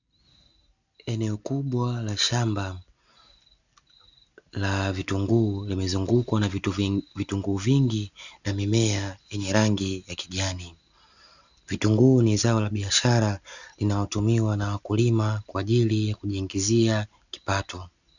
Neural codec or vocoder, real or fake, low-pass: none; real; 7.2 kHz